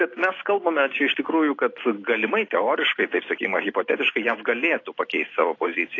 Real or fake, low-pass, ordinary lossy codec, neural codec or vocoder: real; 7.2 kHz; AAC, 32 kbps; none